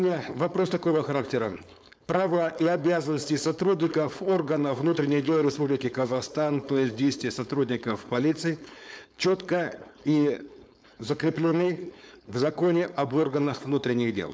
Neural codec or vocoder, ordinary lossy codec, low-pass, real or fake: codec, 16 kHz, 4.8 kbps, FACodec; none; none; fake